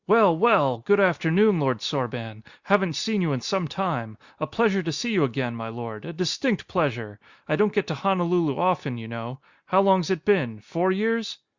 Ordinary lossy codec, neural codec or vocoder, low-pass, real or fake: Opus, 64 kbps; none; 7.2 kHz; real